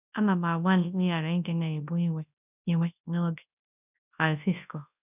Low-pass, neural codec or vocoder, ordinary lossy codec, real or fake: 3.6 kHz; codec, 24 kHz, 0.9 kbps, WavTokenizer, large speech release; none; fake